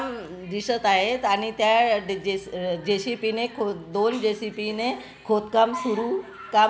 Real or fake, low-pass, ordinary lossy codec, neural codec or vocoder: real; none; none; none